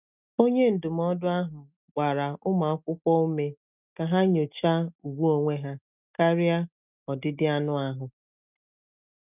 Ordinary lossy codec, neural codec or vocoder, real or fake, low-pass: none; none; real; 3.6 kHz